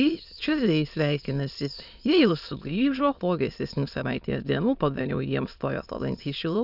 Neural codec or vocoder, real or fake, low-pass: autoencoder, 22.05 kHz, a latent of 192 numbers a frame, VITS, trained on many speakers; fake; 5.4 kHz